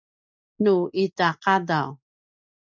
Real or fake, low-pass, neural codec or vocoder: real; 7.2 kHz; none